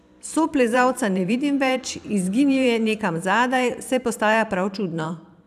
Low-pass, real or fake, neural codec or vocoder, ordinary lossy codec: 14.4 kHz; fake; vocoder, 44.1 kHz, 128 mel bands every 512 samples, BigVGAN v2; none